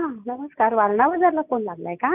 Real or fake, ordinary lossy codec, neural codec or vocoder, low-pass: real; none; none; 3.6 kHz